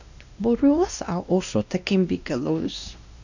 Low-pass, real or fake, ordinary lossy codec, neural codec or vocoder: 7.2 kHz; fake; none; codec, 16 kHz, 1 kbps, X-Codec, WavLM features, trained on Multilingual LibriSpeech